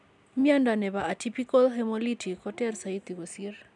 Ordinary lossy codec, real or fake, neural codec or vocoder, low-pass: none; real; none; 10.8 kHz